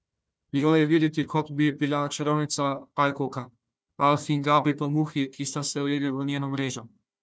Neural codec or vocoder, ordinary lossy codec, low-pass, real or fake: codec, 16 kHz, 1 kbps, FunCodec, trained on Chinese and English, 50 frames a second; none; none; fake